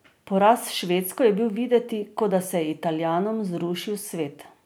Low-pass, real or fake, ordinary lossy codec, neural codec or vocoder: none; real; none; none